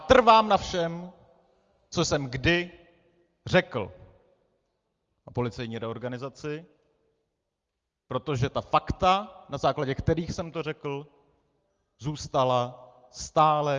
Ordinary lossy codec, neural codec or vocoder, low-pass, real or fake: Opus, 32 kbps; none; 7.2 kHz; real